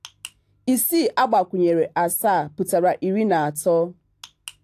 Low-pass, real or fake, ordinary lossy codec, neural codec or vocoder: 14.4 kHz; real; AAC, 64 kbps; none